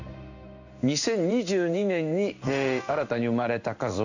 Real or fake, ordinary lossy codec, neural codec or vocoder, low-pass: fake; none; codec, 16 kHz in and 24 kHz out, 1 kbps, XY-Tokenizer; 7.2 kHz